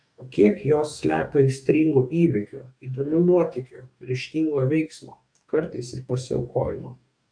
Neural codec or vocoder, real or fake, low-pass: codec, 44.1 kHz, 2.6 kbps, DAC; fake; 9.9 kHz